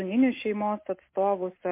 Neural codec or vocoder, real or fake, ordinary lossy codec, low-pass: none; real; MP3, 24 kbps; 3.6 kHz